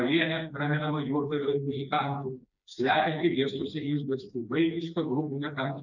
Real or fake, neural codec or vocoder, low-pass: fake; codec, 16 kHz, 2 kbps, FreqCodec, smaller model; 7.2 kHz